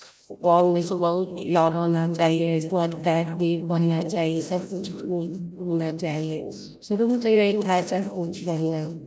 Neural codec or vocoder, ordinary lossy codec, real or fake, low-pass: codec, 16 kHz, 0.5 kbps, FreqCodec, larger model; none; fake; none